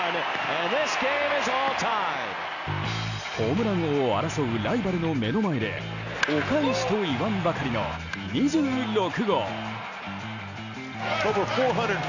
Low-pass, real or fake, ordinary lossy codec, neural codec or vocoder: 7.2 kHz; real; none; none